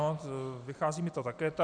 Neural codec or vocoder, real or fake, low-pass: none; real; 9.9 kHz